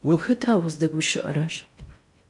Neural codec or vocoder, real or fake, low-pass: codec, 16 kHz in and 24 kHz out, 0.6 kbps, FocalCodec, streaming, 4096 codes; fake; 10.8 kHz